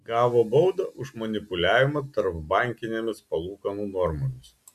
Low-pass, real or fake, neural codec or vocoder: 14.4 kHz; real; none